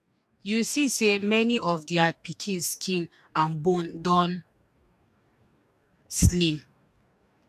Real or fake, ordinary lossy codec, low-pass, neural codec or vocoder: fake; none; 14.4 kHz; codec, 44.1 kHz, 2.6 kbps, DAC